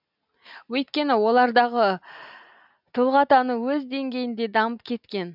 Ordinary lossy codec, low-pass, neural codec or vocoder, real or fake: none; 5.4 kHz; none; real